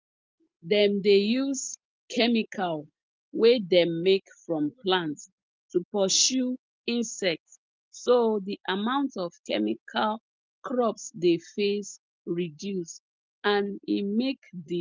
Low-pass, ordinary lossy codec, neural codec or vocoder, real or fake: 7.2 kHz; Opus, 32 kbps; none; real